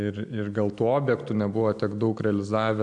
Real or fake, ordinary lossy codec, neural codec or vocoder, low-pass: real; MP3, 96 kbps; none; 9.9 kHz